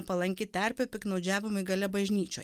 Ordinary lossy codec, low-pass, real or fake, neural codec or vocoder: Opus, 32 kbps; 14.4 kHz; real; none